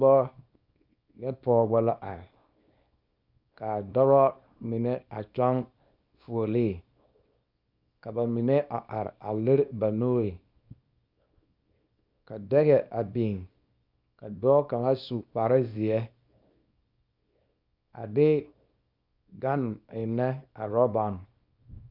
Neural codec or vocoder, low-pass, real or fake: codec, 24 kHz, 0.9 kbps, WavTokenizer, small release; 5.4 kHz; fake